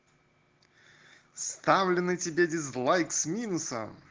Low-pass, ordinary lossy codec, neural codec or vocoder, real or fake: 7.2 kHz; Opus, 16 kbps; none; real